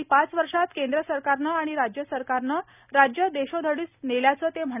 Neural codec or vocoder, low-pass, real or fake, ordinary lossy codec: none; 3.6 kHz; real; none